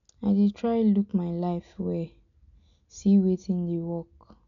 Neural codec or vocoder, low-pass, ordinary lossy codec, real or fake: none; 7.2 kHz; none; real